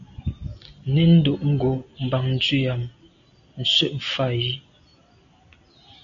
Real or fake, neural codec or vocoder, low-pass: real; none; 7.2 kHz